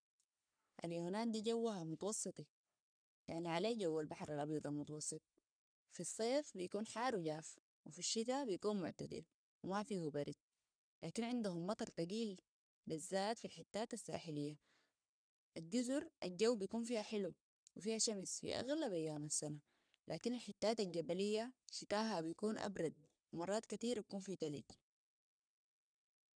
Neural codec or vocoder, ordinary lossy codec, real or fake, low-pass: codec, 44.1 kHz, 3.4 kbps, Pupu-Codec; none; fake; 9.9 kHz